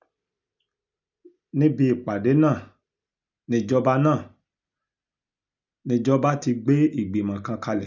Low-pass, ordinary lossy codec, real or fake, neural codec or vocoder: 7.2 kHz; none; real; none